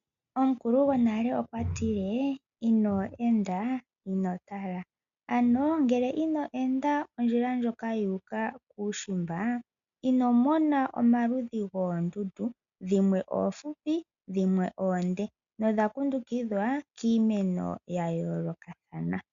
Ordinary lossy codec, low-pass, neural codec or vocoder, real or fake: AAC, 96 kbps; 7.2 kHz; none; real